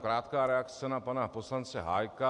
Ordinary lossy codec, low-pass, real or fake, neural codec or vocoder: AAC, 64 kbps; 10.8 kHz; real; none